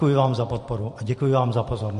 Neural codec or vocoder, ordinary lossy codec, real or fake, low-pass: vocoder, 44.1 kHz, 128 mel bands every 512 samples, BigVGAN v2; MP3, 48 kbps; fake; 14.4 kHz